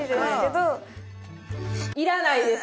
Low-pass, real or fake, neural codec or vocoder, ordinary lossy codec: none; real; none; none